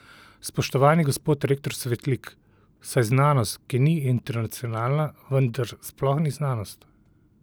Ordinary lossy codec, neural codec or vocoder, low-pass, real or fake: none; none; none; real